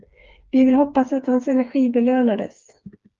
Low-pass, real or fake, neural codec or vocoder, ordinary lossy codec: 7.2 kHz; fake; codec, 16 kHz, 4 kbps, FreqCodec, smaller model; Opus, 32 kbps